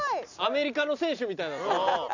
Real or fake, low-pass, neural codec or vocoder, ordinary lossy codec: real; 7.2 kHz; none; none